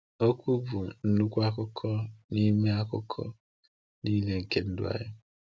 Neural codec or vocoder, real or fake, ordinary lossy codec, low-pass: none; real; none; none